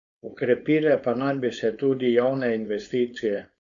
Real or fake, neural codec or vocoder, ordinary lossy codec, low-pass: fake; codec, 16 kHz, 4.8 kbps, FACodec; none; 7.2 kHz